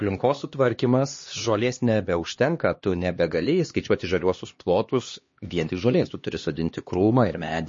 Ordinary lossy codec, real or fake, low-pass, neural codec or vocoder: MP3, 32 kbps; fake; 7.2 kHz; codec, 16 kHz, 2 kbps, X-Codec, HuBERT features, trained on LibriSpeech